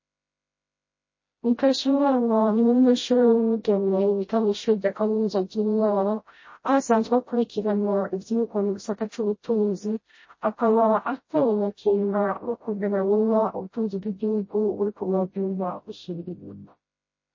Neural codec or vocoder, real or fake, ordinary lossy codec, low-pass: codec, 16 kHz, 0.5 kbps, FreqCodec, smaller model; fake; MP3, 32 kbps; 7.2 kHz